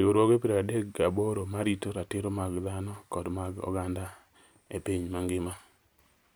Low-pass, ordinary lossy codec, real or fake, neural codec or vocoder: none; none; real; none